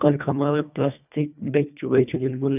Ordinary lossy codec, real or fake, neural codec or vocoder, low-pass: none; fake; codec, 24 kHz, 1.5 kbps, HILCodec; 3.6 kHz